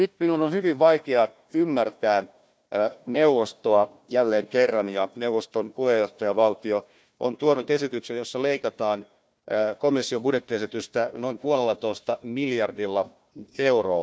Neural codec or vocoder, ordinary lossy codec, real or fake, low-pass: codec, 16 kHz, 1 kbps, FunCodec, trained on Chinese and English, 50 frames a second; none; fake; none